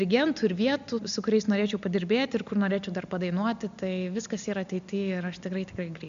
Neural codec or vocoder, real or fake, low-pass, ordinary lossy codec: none; real; 7.2 kHz; MP3, 64 kbps